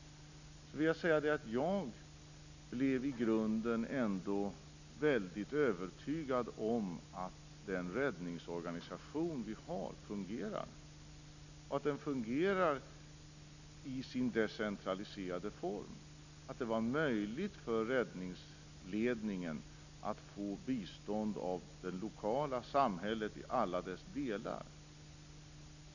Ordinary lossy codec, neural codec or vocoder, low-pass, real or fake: none; none; 7.2 kHz; real